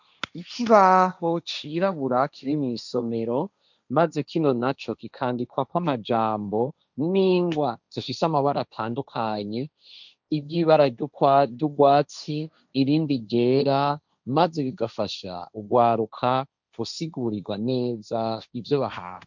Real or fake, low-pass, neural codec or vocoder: fake; 7.2 kHz; codec, 16 kHz, 1.1 kbps, Voila-Tokenizer